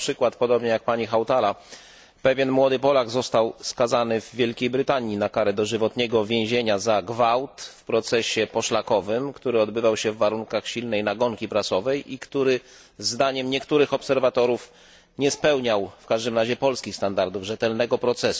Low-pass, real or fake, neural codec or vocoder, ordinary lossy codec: none; real; none; none